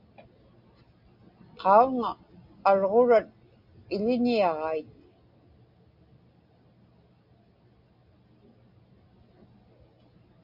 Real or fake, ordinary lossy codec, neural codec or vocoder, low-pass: real; Opus, 64 kbps; none; 5.4 kHz